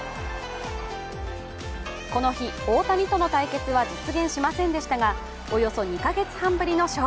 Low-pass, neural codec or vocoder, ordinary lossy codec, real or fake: none; none; none; real